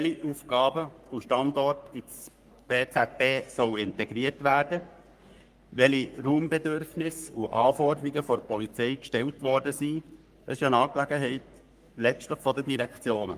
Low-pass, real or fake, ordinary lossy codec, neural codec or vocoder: 14.4 kHz; fake; Opus, 32 kbps; codec, 44.1 kHz, 3.4 kbps, Pupu-Codec